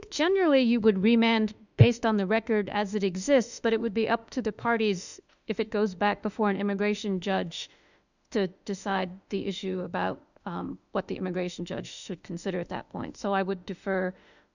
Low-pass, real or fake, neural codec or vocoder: 7.2 kHz; fake; autoencoder, 48 kHz, 32 numbers a frame, DAC-VAE, trained on Japanese speech